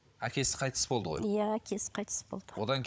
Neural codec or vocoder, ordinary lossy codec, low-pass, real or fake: codec, 16 kHz, 16 kbps, FunCodec, trained on Chinese and English, 50 frames a second; none; none; fake